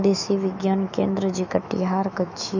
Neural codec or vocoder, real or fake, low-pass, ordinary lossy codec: autoencoder, 48 kHz, 128 numbers a frame, DAC-VAE, trained on Japanese speech; fake; 7.2 kHz; none